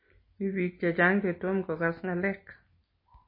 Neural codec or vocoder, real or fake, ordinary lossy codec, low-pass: none; real; MP3, 24 kbps; 5.4 kHz